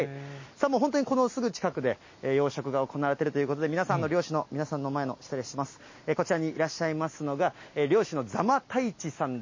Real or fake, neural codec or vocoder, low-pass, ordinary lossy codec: real; none; 7.2 kHz; MP3, 32 kbps